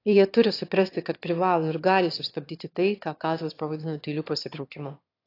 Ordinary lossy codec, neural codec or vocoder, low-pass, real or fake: AAC, 32 kbps; autoencoder, 22.05 kHz, a latent of 192 numbers a frame, VITS, trained on one speaker; 5.4 kHz; fake